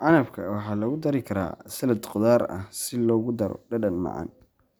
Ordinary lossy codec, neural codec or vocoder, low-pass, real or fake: none; none; none; real